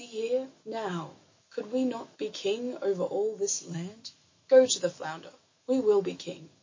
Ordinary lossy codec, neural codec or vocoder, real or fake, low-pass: MP3, 32 kbps; autoencoder, 48 kHz, 128 numbers a frame, DAC-VAE, trained on Japanese speech; fake; 7.2 kHz